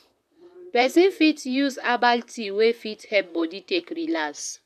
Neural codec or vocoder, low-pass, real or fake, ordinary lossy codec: vocoder, 44.1 kHz, 128 mel bands, Pupu-Vocoder; 14.4 kHz; fake; none